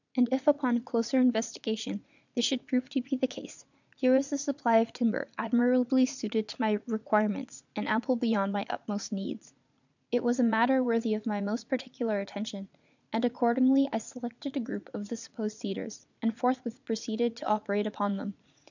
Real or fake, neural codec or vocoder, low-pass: fake; vocoder, 44.1 kHz, 80 mel bands, Vocos; 7.2 kHz